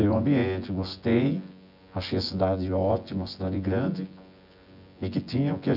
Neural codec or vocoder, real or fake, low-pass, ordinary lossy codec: vocoder, 24 kHz, 100 mel bands, Vocos; fake; 5.4 kHz; none